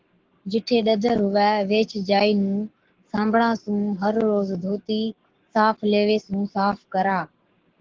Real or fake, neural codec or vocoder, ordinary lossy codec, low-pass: fake; codec, 44.1 kHz, 7.8 kbps, Pupu-Codec; Opus, 16 kbps; 7.2 kHz